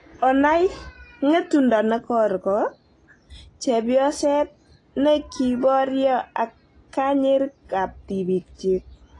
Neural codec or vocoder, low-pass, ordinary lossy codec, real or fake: none; 10.8 kHz; AAC, 32 kbps; real